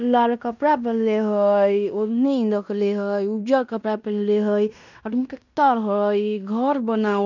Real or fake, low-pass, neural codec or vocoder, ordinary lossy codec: fake; 7.2 kHz; codec, 16 kHz in and 24 kHz out, 0.9 kbps, LongCat-Audio-Codec, fine tuned four codebook decoder; none